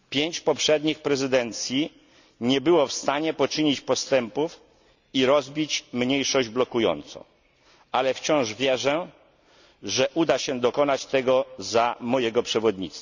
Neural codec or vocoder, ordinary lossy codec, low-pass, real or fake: none; none; 7.2 kHz; real